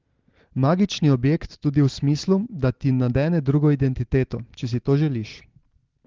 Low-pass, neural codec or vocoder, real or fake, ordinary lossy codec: 7.2 kHz; none; real; Opus, 16 kbps